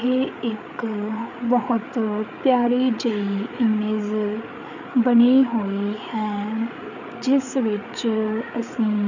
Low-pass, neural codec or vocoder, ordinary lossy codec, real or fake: 7.2 kHz; codec, 16 kHz, 8 kbps, FreqCodec, larger model; none; fake